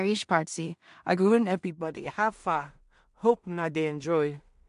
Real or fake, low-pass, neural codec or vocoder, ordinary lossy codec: fake; 10.8 kHz; codec, 16 kHz in and 24 kHz out, 0.4 kbps, LongCat-Audio-Codec, two codebook decoder; MP3, 64 kbps